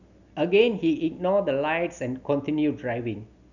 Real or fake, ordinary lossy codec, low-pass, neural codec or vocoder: real; none; 7.2 kHz; none